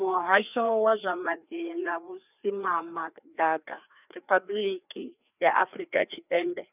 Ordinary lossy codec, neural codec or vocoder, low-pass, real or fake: none; codec, 16 kHz, 2 kbps, FreqCodec, larger model; 3.6 kHz; fake